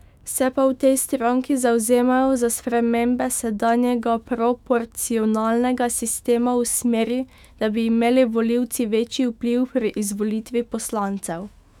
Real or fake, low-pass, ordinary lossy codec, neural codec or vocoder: fake; 19.8 kHz; none; autoencoder, 48 kHz, 128 numbers a frame, DAC-VAE, trained on Japanese speech